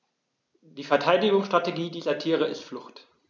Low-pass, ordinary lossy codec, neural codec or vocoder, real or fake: none; none; none; real